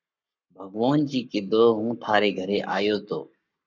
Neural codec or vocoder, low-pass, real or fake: codec, 44.1 kHz, 7.8 kbps, Pupu-Codec; 7.2 kHz; fake